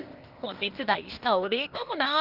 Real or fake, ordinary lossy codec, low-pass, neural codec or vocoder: fake; Opus, 32 kbps; 5.4 kHz; codec, 16 kHz, 0.8 kbps, ZipCodec